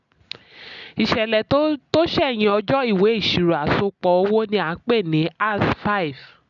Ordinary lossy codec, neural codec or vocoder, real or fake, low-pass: none; none; real; 7.2 kHz